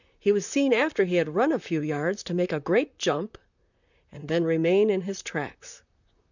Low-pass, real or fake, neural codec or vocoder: 7.2 kHz; fake; vocoder, 44.1 kHz, 128 mel bands, Pupu-Vocoder